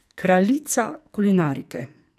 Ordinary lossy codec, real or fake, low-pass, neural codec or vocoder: none; fake; 14.4 kHz; codec, 44.1 kHz, 2.6 kbps, SNAC